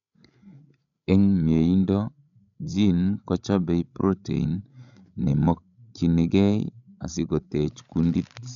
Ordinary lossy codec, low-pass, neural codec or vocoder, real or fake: none; 7.2 kHz; codec, 16 kHz, 16 kbps, FreqCodec, larger model; fake